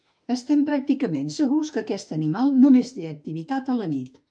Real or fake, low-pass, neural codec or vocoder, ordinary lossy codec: fake; 9.9 kHz; autoencoder, 48 kHz, 32 numbers a frame, DAC-VAE, trained on Japanese speech; MP3, 64 kbps